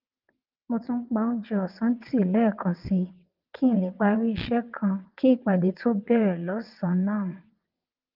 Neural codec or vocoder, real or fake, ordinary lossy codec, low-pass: vocoder, 22.05 kHz, 80 mel bands, WaveNeXt; fake; Opus, 24 kbps; 5.4 kHz